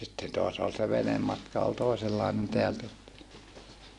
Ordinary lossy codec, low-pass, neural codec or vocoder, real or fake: AAC, 48 kbps; 10.8 kHz; none; real